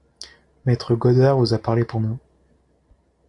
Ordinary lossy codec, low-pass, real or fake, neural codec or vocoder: AAC, 64 kbps; 10.8 kHz; real; none